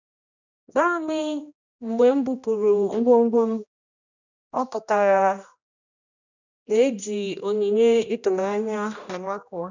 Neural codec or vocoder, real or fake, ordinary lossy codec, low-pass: codec, 16 kHz, 1 kbps, X-Codec, HuBERT features, trained on general audio; fake; none; 7.2 kHz